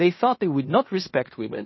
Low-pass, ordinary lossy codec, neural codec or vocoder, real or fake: 7.2 kHz; MP3, 24 kbps; codec, 16 kHz in and 24 kHz out, 0.9 kbps, LongCat-Audio-Codec, fine tuned four codebook decoder; fake